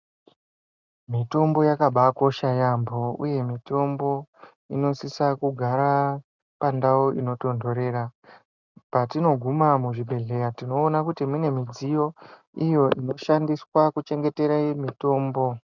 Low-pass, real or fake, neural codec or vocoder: 7.2 kHz; real; none